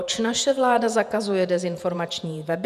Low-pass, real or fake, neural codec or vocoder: 14.4 kHz; fake; vocoder, 48 kHz, 128 mel bands, Vocos